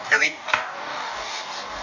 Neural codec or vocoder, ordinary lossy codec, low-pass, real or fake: codec, 44.1 kHz, 2.6 kbps, DAC; none; 7.2 kHz; fake